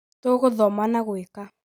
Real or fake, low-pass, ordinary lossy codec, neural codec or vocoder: real; none; none; none